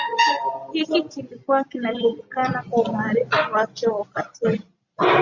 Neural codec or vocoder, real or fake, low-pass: none; real; 7.2 kHz